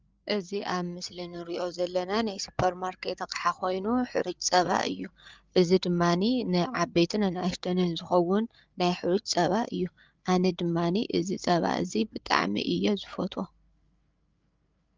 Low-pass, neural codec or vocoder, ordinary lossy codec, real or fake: 7.2 kHz; codec, 16 kHz, 8 kbps, FreqCodec, larger model; Opus, 32 kbps; fake